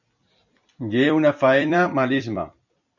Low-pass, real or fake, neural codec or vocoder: 7.2 kHz; fake; vocoder, 44.1 kHz, 128 mel bands every 256 samples, BigVGAN v2